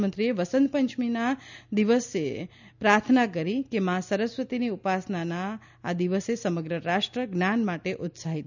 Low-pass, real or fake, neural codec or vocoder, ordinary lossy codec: 7.2 kHz; real; none; none